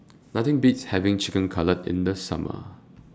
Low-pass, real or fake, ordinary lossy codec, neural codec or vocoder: none; real; none; none